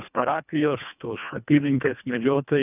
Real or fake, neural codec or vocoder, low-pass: fake; codec, 24 kHz, 1.5 kbps, HILCodec; 3.6 kHz